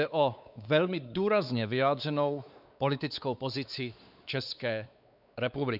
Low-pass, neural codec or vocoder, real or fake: 5.4 kHz; codec, 16 kHz, 4 kbps, X-Codec, WavLM features, trained on Multilingual LibriSpeech; fake